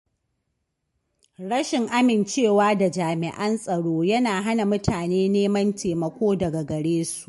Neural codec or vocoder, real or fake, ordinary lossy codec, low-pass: none; real; MP3, 48 kbps; 14.4 kHz